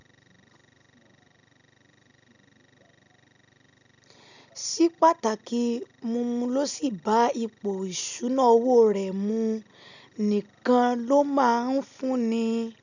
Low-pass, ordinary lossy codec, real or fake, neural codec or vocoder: 7.2 kHz; none; real; none